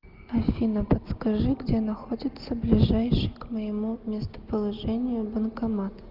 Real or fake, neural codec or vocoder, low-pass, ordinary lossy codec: real; none; 5.4 kHz; Opus, 32 kbps